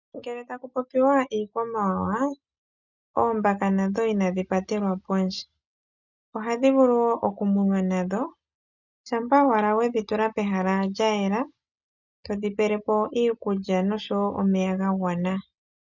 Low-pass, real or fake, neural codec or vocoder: 7.2 kHz; real; none